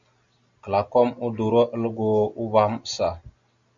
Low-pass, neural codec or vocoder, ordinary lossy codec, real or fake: 7.2 kHz; none; Opus, 64 kbps; real